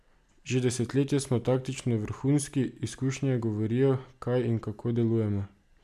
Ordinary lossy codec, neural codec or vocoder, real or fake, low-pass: none; none; real; 14.4 kHz